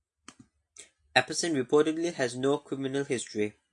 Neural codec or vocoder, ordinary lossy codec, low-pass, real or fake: none; AAC, 64 kbps; 10.8 kHz; real